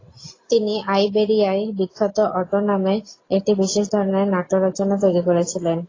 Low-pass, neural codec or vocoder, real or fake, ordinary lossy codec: 7.2 kHz; none; real; AAC, 32 kbps